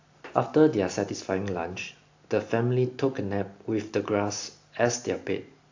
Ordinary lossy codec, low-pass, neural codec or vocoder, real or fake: AAC, 48 kbps; 7.2 kHz; none; real